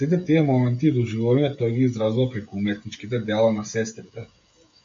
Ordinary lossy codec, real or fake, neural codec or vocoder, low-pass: MP3, 48 kbps; fake; codec, 16 kHz, 16 kbps, FreqCodec, smaller model; 7.2 kHz